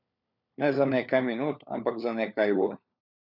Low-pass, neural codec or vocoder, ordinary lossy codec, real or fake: 5.4 kHz; codec, 16 kHz, 4 kbps, FunCodec, trained on LibriTTS, 50 frames a second; none; fake